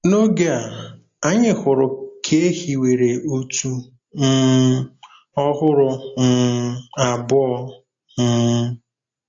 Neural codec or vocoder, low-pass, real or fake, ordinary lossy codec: none; 7.2 kHz; real; AAC, 48 kbps